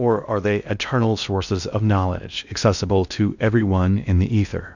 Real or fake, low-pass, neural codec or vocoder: fake; 7.2 kHz; codec, 16 kHz in and 24 kHz out, 0.6 kbps, FocalCodec, streaming, 2048 codes